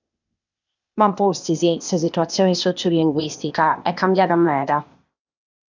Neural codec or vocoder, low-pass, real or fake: codec, 16 kHz, 0.8 kbps, ZipCodec; 7.2 kHz; fake